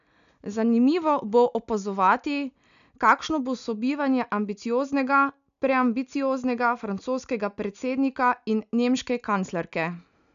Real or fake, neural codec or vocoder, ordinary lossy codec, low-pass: real; none; none; 7.2 kHz